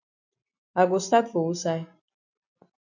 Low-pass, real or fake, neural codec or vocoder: 7.2 kHz; real; none